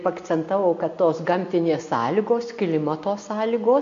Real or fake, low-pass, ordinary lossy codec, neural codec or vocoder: real; 7.2 kHz; AAC, 48 kbps; none